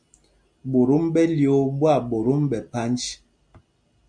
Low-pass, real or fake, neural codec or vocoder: 9.9 kHz; real; none